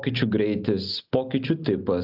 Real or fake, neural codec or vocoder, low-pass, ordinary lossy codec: real; none; 5.4 kHz; AAC, 48 kbps